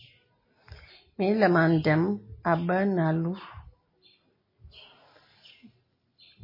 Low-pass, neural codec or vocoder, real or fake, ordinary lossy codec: 5.4 kHz; none; real; MP3, 24 kbps